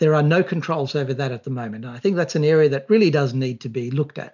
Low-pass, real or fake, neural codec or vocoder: 7.2 kHz; real; none